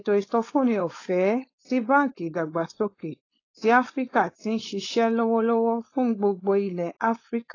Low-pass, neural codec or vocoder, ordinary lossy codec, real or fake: 7.2 kHz; codec, 16 kHz, 4.8 kbps, FACodec; AAC, 32 kbps; fake